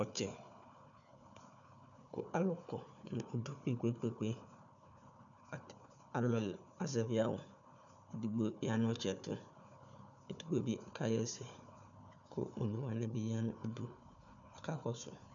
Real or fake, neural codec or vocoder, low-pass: fake; codec, 16 kHz, 4 kbps, FunCodec, trained on Chinese and English, 50 frames a second; 7.2 kHz